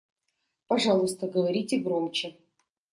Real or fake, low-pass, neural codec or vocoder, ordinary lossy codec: real; 10.8 kHz; none; MP3, 64 kbps